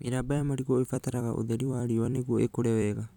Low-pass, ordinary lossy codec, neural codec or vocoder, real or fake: 19.8 kHz; none; vocoder, 44.1 kHz, 128 mel bands every 256 samples, BigVGAN v2; fake